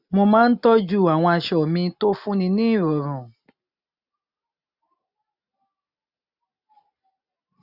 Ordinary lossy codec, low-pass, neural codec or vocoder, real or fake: none; 5.4 kHz; none; real